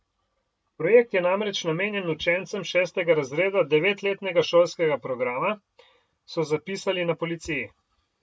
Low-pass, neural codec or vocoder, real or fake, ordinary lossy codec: none; none; real; none